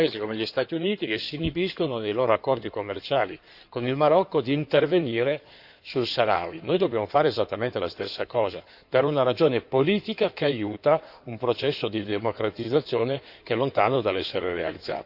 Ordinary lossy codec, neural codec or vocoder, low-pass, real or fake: none; codec, 16 kHz in and 24 kHz out, 2.2 kbps, FireRedTTS-2 codec; 5.4 kHz; fake